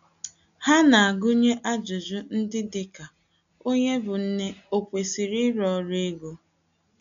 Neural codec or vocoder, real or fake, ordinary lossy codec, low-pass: none; real; none; 7.2 kHz